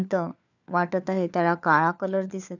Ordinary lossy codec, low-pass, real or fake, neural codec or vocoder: none; 7.2 kHz; fake; codec, 16 kHz, 4 kbps, FunCodec, trained on LibriTTS, 50 frames a second